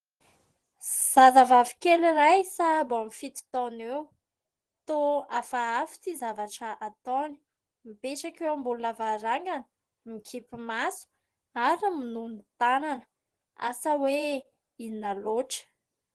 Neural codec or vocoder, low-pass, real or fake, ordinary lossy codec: vocoder, 24 kHz, 100 mel bands, Vocos; 10.8 kHz; fake; Opus, 16 kbps